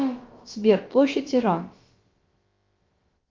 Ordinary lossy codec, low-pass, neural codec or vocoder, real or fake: Opus, 32 kbps; 7.2 kHz; codec, 16 kHz, about 1 kbps, DyCAST, with the encoder's durations; fake